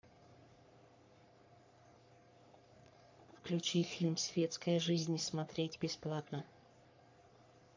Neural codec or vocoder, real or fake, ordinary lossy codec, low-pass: codec, 44.1 kHz, 3.4 kbps, Pupu-Codec; fake; MP3, 64 kbps; 7.2 kHz